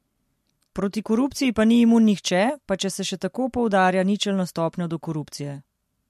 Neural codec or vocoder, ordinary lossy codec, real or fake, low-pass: none; MP3, 64 kbps; real; 14.4 kHz